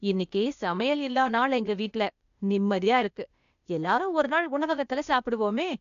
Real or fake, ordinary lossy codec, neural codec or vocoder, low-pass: fake; none; codec, 16 kHz, 0.8 kbps, ZipCodec; 7.2 kHz